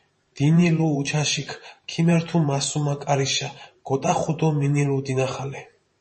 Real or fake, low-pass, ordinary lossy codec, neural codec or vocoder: fake; 10.8 kHz; MP3, 32 kbps; vocoder, 44.1 kHz, 128 mel bands every 512 samples, BigVGAN v2